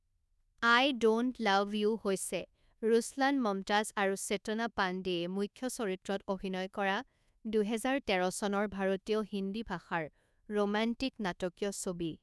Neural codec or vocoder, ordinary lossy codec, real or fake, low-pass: codec, 24 kHz, 1.2 kbps, DualCodec; none; fake; none